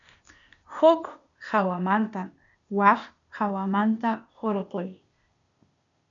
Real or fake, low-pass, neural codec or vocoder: fake; 7.2 kHz; codec, 16 kHz, 0.8 kbps, ZipCodec